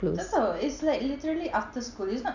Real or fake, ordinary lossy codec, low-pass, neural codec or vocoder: real; none; 7.2 kHz; none